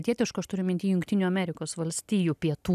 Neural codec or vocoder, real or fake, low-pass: none; real; 14.4 kHz